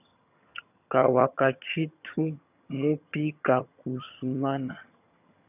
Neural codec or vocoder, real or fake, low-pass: vocoder, 22.05 kHz, 80 mel bands, HiFi-GAN; fake; 3.6 kHz